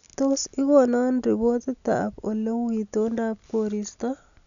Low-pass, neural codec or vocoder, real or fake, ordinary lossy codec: 7.2 kHz; none; real; none